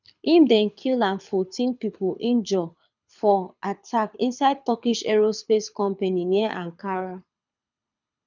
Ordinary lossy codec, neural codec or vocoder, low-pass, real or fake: none; codec, 24 kHz, 6 kbps, HILCodec; 7.2 kHz; fake